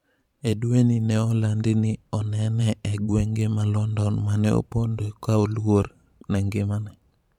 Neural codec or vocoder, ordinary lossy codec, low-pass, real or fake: vocoder, 44.1 kHz, 128 mel bands every 512 samples, BigVGAN v2; MP3, 96 kbps; 19.8 kHz; fake